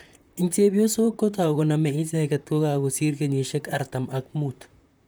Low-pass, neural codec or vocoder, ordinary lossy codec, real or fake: none; vocoder, 44.1 kHz, 128 mel bands, Pupu-Vocoder; none; fake